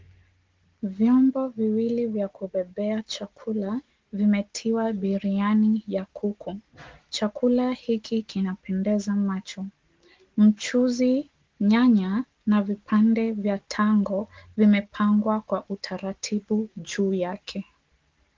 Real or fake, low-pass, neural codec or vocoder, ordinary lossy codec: real; 7.2 kHz; none; Opus, 16 kbps